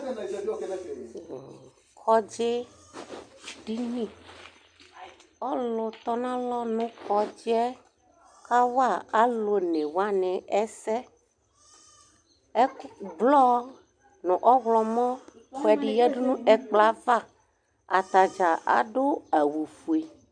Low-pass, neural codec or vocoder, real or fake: 9.9 kHz; none; real